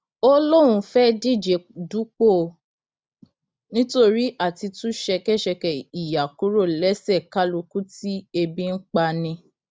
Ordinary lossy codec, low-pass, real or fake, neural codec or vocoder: none; none; real; none